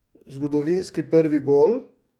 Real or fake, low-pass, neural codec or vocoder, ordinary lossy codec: fake; 19.8 kHz; codec, 44.1 kHz, 2.6 kbps, DAC; none